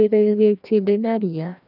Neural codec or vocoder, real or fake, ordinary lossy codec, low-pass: codec, 16 kHz, 1 kbps, FreqCodec, larger model; fake; none; 5.4 kHz